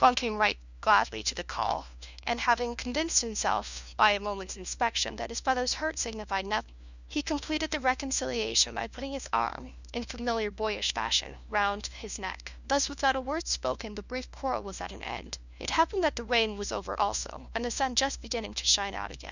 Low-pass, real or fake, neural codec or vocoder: 7.2 kHz; fake; codec, 16 kHz, 1 kbps, FunCodec, trained on LibriTTS, 50 frames a second